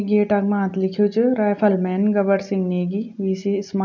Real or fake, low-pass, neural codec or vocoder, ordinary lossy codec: real; 7.2 kHz; none; none